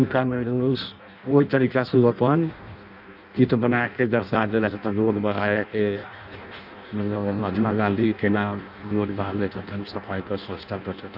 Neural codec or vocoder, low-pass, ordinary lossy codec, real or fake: codec, 16 kHz in and 24 kHz out, 0.6 kbps, FireRedTTS-2 codec; 5.4 kHz; none; fake